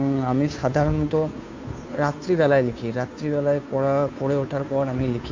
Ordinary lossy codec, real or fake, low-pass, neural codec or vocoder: MP3, 64 kbps; fake; 7.2 kHz; codec, 16 kHz, 2 kbps, FunCodec, trained on Chinese and English, 25 frames a second